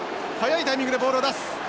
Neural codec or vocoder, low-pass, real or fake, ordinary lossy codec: none; none; real; none